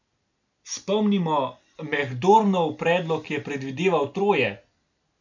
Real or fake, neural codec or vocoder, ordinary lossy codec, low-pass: real; none; none; 7.2 kHz